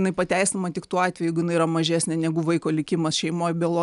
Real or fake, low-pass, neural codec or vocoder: real; 10.8 kHz; none